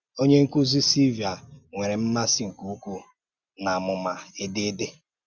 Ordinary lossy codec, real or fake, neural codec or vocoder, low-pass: Opus, 64 kbps; real; none; 7.2 kHz